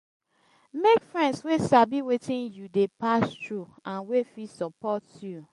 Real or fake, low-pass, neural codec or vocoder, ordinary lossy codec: real; 14.4 kHz; none; MP3, 48 kbps